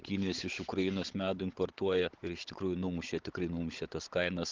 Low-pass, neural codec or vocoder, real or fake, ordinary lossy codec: 7.2 kHz; codec, 16 kHz, 16 kbps, FunCodec, trained on Chinese and English, 50 frames a second; fake; Opus, 16 kbps